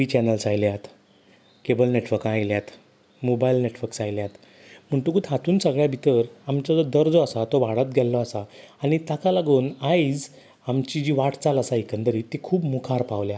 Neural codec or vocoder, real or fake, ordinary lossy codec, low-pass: none; real; none; none